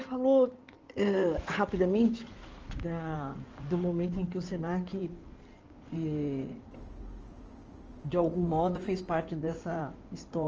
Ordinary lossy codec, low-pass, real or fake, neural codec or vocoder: Opus, 24 kbps; 7.2 kHz; fake; codec, 16 kHz in and 24 kHz out, 2.2 kbps, FireRedTTS-2 codec